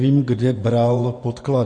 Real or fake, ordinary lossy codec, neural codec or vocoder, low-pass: fake; MP3, 48 kbps; vocoder, 24 kHz, 100 mel bands, Vocos; 9.9 kHz